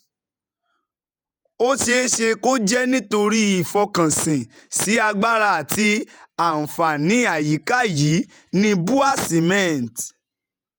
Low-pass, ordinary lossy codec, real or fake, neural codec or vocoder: none; none; fake; vocoder, 48 kHz, 128 mel bands, Vocos